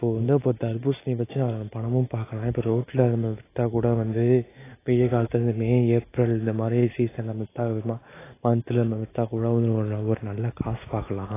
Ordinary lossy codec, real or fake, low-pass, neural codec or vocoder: AAC, 16 kbps; real; 3.6 kHz; none